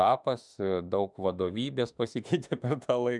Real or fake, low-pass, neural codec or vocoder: fake; 10.8 kHz; autoencoder, 48 kHz, 32 numbers a frame, DAC-VAE, trained on Japanese speech